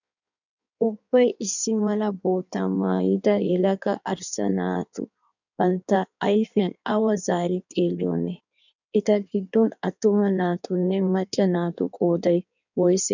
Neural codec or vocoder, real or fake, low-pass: codec, 16 kHz in and 24 kHz out, 1.1 kbps, FireRedTTS-2 codec; fake; 7.2 kHz